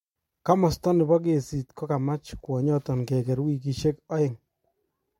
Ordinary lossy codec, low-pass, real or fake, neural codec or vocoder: MP3, 64 kbps; 19.8 kHz; real; none